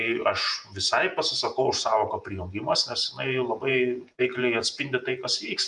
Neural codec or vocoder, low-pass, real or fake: vocoder, 48 kHz, 128 mel bands, Vocos; 10.8 kHz; fake